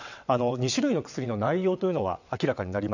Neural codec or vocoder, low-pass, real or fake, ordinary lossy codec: vocoder, 22.05 kHz, 80 mel bands, WaveNeXt; 7.2 kHz; fake; none